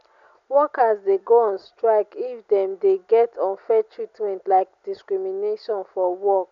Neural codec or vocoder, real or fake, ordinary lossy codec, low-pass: none; real; none; 7.2 kHz